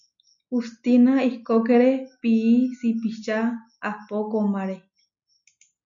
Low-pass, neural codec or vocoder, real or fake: 7.2 kHz; none; real